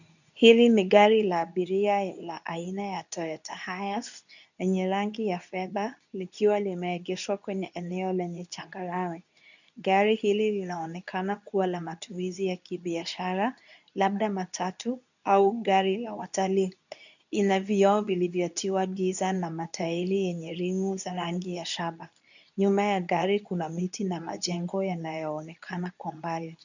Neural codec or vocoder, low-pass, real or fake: codec, 24 kHz, 0.9 kbps, WavTokenizer, medium speech release version 2; 7.2 kHz; fake